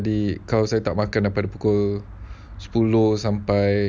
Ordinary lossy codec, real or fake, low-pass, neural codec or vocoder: none; real; none; none